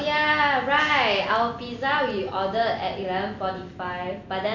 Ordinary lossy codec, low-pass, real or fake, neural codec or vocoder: none; 7.2 kHz; real; none